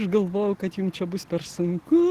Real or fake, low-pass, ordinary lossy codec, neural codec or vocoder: real; 14.4 kHz; Opus, 16 kbps; none